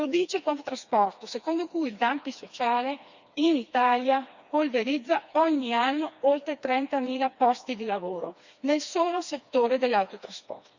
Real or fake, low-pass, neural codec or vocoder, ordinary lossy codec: fake; 7.2 kHz; codec, 16 kHz, 2 kbps, FreqCodec, smaller model; Opus, 64 kbps